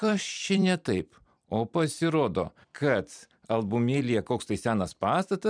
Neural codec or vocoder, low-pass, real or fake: vocoder, 44.1 kHz, 128 mel bands every 256 samples, BigVGAN v2; 9.9 kHz; fake